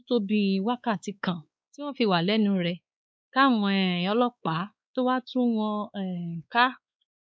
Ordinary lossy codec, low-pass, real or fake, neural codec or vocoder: none; none; fake; codec, 16 kHz, 4 kbps, X-Codec, WavLM features, trained on Multilingual LibriSpeech